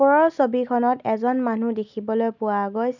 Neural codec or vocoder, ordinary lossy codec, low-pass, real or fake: none; none; 7.2 kHz; real